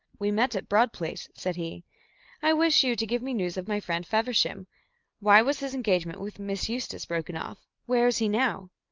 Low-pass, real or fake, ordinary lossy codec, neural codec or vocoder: 7.2 kHz; real; Opus, 32 kbps; none